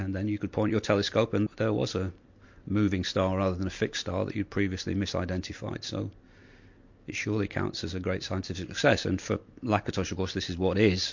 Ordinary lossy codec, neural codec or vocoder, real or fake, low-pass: MP3, 48 kbps; none; real; 7.2 kHz